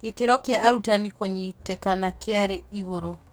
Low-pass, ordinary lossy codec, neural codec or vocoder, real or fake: none; none; codec, 44.1 kHz, 2.6 kbps, DAC; fake